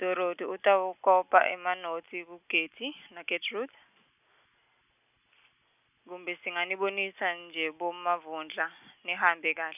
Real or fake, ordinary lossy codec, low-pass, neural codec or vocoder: real; none; 3.6 kHz; none